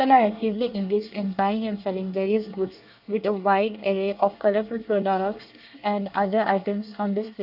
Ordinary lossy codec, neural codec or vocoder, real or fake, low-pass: AAC, 48 kbps; codec, 24 kHz, 1 kbps, SNAC; fake; 5.4 kHz